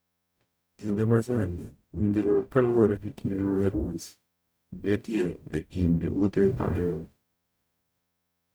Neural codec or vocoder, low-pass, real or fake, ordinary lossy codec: codec, 44.1 kHz, 0.9 kbps, DAC; none; fake; none